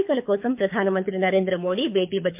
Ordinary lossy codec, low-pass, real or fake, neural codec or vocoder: MP3, 32 kbps; 3.6 kHz; fake; codec, 24 kHz, 6 kbps, HILCodec